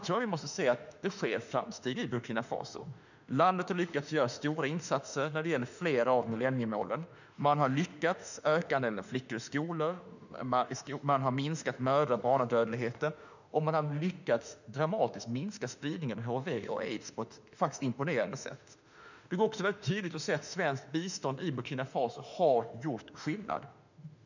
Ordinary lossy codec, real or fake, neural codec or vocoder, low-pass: none; fake; autoencoder, 48 kHz, 32 numbers a frame, DAC-VAE, trained on Japanese speech; 7.2 kHz